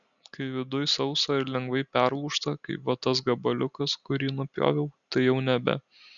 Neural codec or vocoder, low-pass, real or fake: none; 7.2 kHz; real